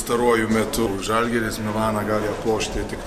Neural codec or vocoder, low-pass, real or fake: none; 14.4 kHz; real